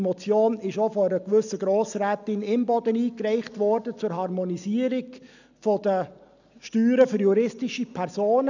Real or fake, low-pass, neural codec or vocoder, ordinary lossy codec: real; 7.2 kHz; none; none